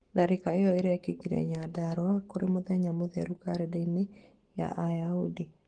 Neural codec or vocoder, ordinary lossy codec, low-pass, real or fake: codec, 44.1 kHz, 7.8 kbps, Pupu-Codec; Opus, 16 kbps; 9.9 kHz; fake